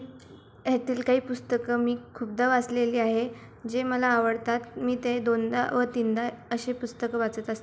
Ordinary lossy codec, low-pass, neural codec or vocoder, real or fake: none; none; none; real